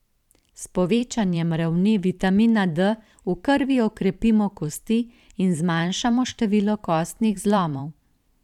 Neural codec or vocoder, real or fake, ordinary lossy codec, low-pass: vocoder, 44.1 kHz, 128 mel bands every 512 samples, BigVGAN v2; fake; none; 19.8 kHz